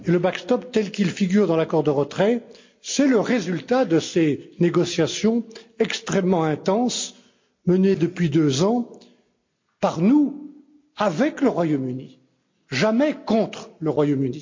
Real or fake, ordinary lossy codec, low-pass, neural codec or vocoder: real; MP3, 48 kbps; 7.2 kHz; none